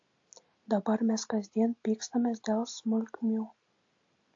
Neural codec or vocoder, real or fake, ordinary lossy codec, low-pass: none; real; AAC, 48 kbps; 7.2 kHz